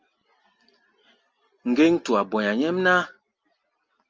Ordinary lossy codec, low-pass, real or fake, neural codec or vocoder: Opus, 24 kbps; 7.2 kHz; real; none